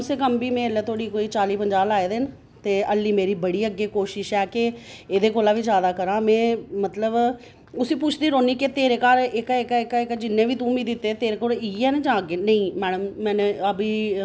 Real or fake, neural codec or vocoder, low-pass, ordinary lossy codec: real; none; none; none